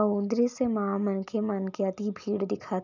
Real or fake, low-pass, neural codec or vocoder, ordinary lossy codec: real; 7.2 kHz; none; none